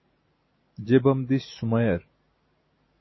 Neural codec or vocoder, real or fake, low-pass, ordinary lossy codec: none; real; 7.2 kHz; MP3, 24 kbps